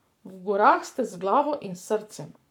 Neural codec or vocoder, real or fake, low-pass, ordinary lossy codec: codec, 44.1 kHz, 7.8 kbps, Pupu-Codec; fake; 19.8 kHz; none